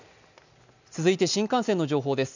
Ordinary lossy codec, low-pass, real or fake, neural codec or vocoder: none; 7.2 kHz; real; none